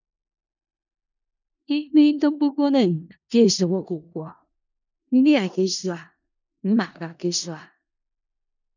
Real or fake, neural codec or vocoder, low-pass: fake; codec, 16 kHz in and 24 kHz out, 0.4 kbps, LongCat-Audio-Codec, four codebook decoder; 7.2 kHz